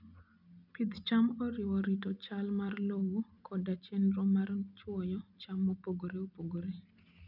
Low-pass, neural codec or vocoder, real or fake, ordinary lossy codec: 5.4 kHz; none; real; none